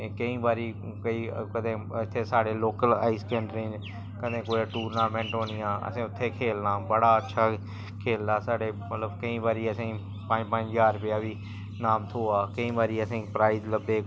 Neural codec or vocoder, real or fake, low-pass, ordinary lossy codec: none; real; none; none